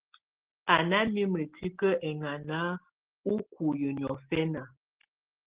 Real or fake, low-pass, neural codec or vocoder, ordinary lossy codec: real; 3.6 kHz; none; Opus, 16 kbps